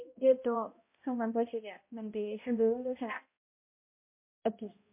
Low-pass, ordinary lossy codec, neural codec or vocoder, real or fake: 3.6 kHz; MP3, 24 kbps; codec, 16 kHz, 0.5 kbps, X-Codec, HuBERT features, trained on balanced general audio; fake